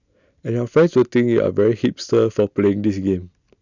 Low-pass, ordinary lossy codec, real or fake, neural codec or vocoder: 7.2 kHz; none; real; none